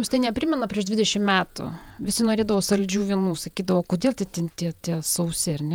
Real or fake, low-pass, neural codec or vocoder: fake; 19.8 kHz; vocoder, 48 kHz, 128 mel bands, Vocos